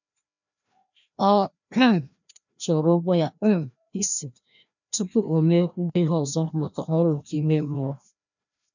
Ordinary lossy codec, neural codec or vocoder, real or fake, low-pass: none; codec, 16 kHz, 1 kbps, FreqCodec, larger model; fake; 7.2 kHz